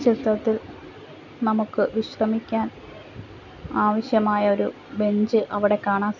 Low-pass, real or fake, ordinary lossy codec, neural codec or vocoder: 7.2 kHz; real; none; none